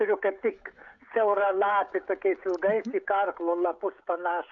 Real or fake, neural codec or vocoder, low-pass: fake; codec, 16 kHz, 16 kbps, FreqCodec, smaller model; 7.2 kHz